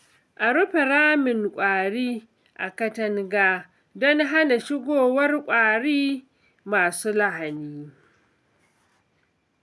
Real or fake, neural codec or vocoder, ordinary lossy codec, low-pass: real; none; none; none